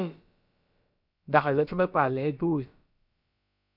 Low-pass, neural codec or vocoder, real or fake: 5.4 kHz; codec, 16 kHz, about 1 kbps, DyCAST, with the encoder's durations; fake